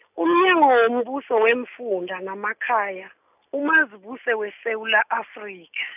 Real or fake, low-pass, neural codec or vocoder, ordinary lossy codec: real; 3.6 kHz; none; none